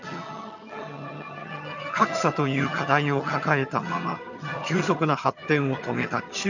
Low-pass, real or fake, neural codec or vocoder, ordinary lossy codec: 7.2 kHz; fake; vocoder, 22.05 kHz, 80 mel bands, HiFi-GAN; none